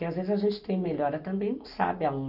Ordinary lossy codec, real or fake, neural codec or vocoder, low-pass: none; real; none; 5.4 kHz